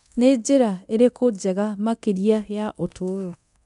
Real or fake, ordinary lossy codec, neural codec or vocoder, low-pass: fake; none; codec, 24 kHz, 0.9 kbps, DualCodec; 10.8 kHz